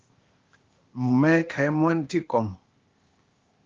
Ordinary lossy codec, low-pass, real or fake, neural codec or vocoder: Opus, 32 kbps; 7.2 kHz; fake; codec, 16 kHz, 0.8 kbps, ZipCodec